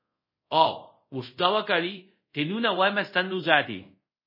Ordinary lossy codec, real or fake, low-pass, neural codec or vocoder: MP3, 24 kbps; fake; 5.4 kHz; codec, 24 kHz, 0.5 kbps, DualCodec